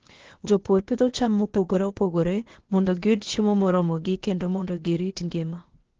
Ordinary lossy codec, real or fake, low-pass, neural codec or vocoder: Opus, 16 kbps; fake; 7.2 kHz; codec, 16 kHz, 0.8 kbps, ZipCodec